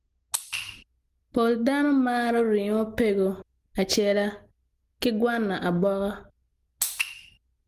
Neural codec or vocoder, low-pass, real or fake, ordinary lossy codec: none; 14.4 kHz; real; Opus, 24 kbps